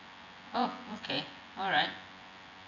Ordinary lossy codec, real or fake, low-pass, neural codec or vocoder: none; fake; 7.2 kHz; vocoder, 24 kHz, 100 mel bands, Vocos